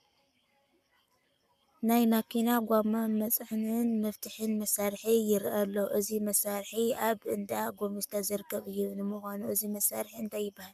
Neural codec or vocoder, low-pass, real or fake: codec, 44.1 kHz, 7.8 kbps, Pupu-Codec; 14.4 kHz; fake